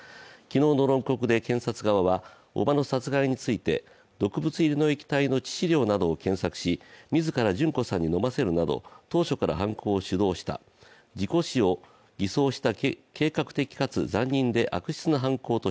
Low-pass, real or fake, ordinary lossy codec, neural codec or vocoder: none; real; none; none